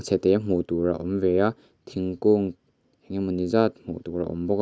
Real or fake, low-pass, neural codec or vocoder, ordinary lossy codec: real; none; none; none